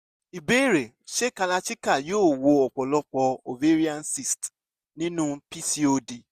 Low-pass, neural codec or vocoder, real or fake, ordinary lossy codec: 14.4 kHz; none; real; none